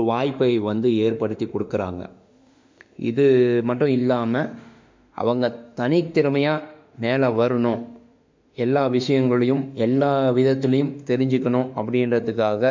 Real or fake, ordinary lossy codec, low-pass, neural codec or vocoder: fake; MP3, 64 kbps; 7.2 kHz; autoencoder, 48 kHz, 32 numbers a frame, DAC-VAE, trained on Japanese speech